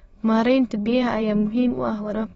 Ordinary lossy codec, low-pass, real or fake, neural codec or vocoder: AAC, 24 kbps; 9.9 kHz; fake; autoencoder, 22.05 kHz, a latent of 192 numbers a frame, VITS, trained on many speakers